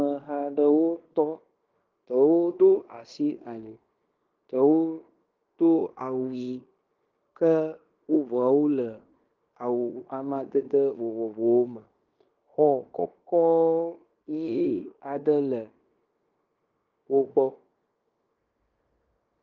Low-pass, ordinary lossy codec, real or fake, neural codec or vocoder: 7.2 kHz; Opus, 24 kbps; fake; codec, 16 kHz in and 24 kHz out, 0.9 kbps, LongCat-Audio-Codec, fine tuned four codebook decoder